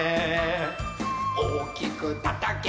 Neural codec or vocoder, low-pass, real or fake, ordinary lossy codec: none; none; real; none